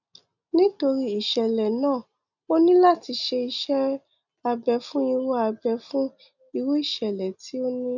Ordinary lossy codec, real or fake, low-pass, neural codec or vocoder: none; real; 7.2 kHz; none